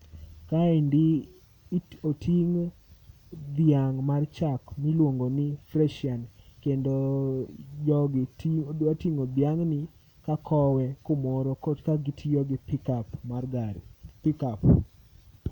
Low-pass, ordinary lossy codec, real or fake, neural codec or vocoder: 19.8 kHz; none; real; none